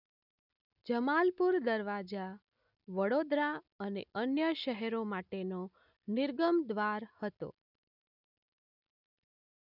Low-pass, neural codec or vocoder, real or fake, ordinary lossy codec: 5.4 kHz; none; real; none